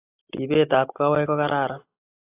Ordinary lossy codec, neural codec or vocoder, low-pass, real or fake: AAC, 24 kbps; none; 3.6 kHz; real